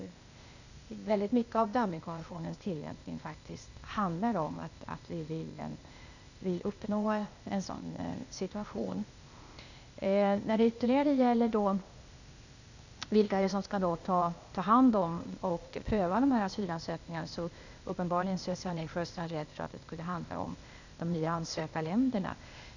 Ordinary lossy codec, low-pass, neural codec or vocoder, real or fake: none; 7.2 kHz; codec, 16 kHz, 0.8 kbps, ZipCodec; fake